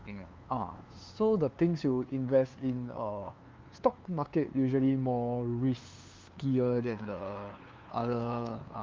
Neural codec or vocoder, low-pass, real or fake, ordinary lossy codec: codec, 16 kHz, 2 kbps, FunCodec, trained on LibriTTS, 25 frames a second; 7.2 kHz; fake; Opus, 24 kbps